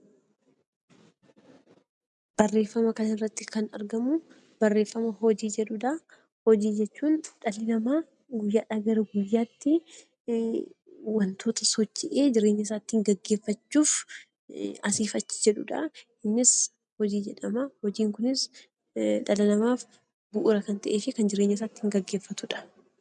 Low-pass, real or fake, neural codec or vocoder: 10.8 kHz; real; none